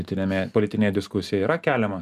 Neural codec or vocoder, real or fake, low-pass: none; real; 14.4 kHz